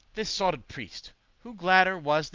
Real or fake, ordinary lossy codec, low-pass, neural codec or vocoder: real; Opus, 24 kbps; 7.2 kHz; none